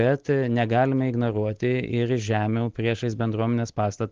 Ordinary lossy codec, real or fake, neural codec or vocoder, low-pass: Opus, 16 kbps; real; none; 7.2 kHz